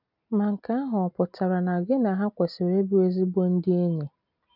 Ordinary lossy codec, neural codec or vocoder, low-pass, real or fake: none; none; 5.4 kHz; real